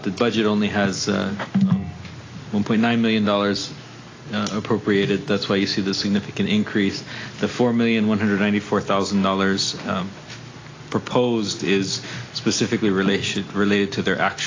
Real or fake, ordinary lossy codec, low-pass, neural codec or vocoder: real; AAC, 32 kbps; 7.2 kHz; none